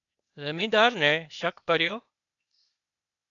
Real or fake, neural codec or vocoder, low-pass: fake; codec, 16 kHz, 0.8 kbps, ZipCodec; 7.2 kHz